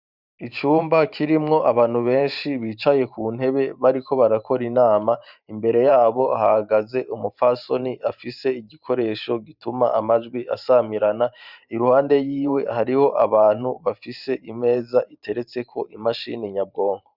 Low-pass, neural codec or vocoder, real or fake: 5.4 kHz; vocoder, 44.1 kHz, 128 mel bands every 512 samples, BigVGAN v2; fake